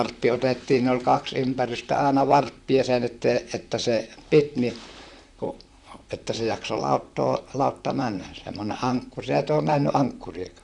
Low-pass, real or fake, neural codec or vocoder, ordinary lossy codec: 10.8 kHz; fake; vocoder, 44.1 kHz, 128 mel bands, Pupu-Vocoder; none